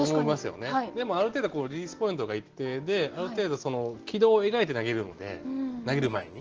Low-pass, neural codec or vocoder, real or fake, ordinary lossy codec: 7.2 kHz; none; real; Opus, 16 kbps